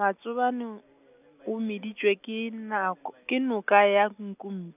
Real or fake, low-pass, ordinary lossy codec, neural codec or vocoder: real; 3.6 kHz; none; none